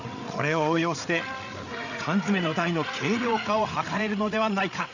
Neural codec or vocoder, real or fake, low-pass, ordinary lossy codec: codec, 16 kHz, 8 kbps, FreqCodec, larger model; fake; 7.2 kHz; none